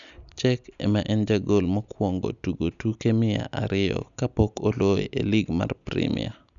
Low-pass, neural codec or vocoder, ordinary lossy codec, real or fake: 7.2 kHz; none; none; real